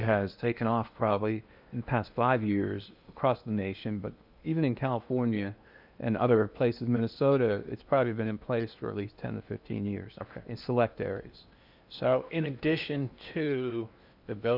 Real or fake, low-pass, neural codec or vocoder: fake; 5.4 kHz; codec, 16 kHz in and 24 kHz out, 0.8 kbps, FocalCodec, streaming, 65536 codes